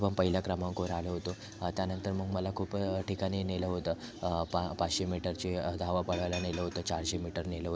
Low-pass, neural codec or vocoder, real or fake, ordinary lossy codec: none; none; real; none